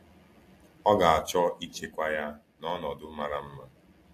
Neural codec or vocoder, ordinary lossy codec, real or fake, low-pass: none; AAC, 64 kbps; real; 14.4 kHz